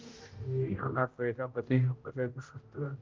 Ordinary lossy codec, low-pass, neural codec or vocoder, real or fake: Opus, 24 kbps; 7.2 kHz; codec, 16 kHz, 0.5 kbps, X-Codec, HuBERT features, trained on general audio; fake